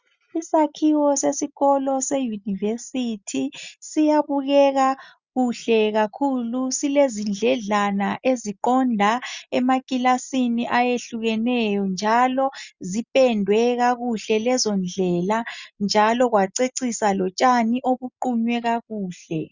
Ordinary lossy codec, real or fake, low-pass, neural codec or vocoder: Opus, 64 kbps; real; 7.2 kHz; none